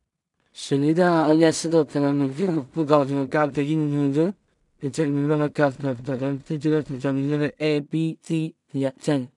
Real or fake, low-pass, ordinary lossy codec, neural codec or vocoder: fake; 10.8 kHz; none; codec, 16 kHz in and 24 kHz out, 0.4 kbps, LongCat-Audio-Codec, two codebook decoder